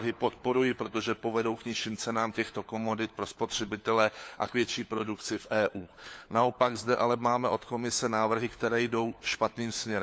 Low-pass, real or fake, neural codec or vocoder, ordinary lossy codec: none; fake; codec, 16 kHz, 4 kbps, FunCodec, trained on LibriTTS, 50 frames a second; none